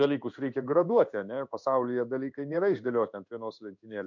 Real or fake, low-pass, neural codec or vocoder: fake; 7.2 kHz; codec, 16 kHz in and 24 kHz out, 1 kbps, XY-Tokenizer